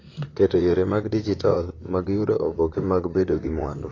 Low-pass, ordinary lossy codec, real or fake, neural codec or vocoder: 7.2 kHz; AAC, 32 kbps; fake; vocoder, 44.1 kHz, 128 mel bands, Pupu-Vocoder